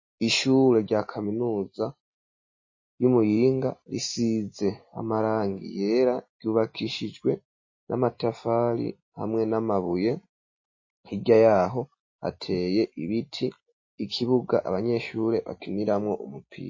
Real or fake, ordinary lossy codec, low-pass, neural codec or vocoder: real; MP3, 32 kbps; 7.2 kHz; none